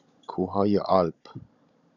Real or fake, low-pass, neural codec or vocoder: fake; 7.2 kHz; codec, 16 kHz, 16 kbps, FunCodec, trained on Chinese and English, 50 frames a second